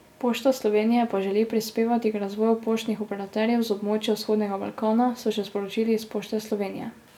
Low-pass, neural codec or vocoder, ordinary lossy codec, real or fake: 19.8 kHz; none; none; real